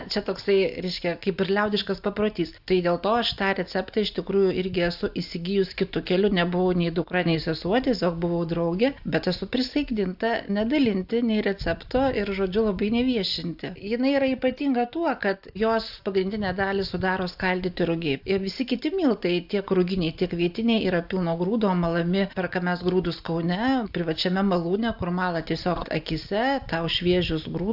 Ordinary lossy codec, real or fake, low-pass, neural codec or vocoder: AAC, 48 kbps; real; 5.4 kHz; none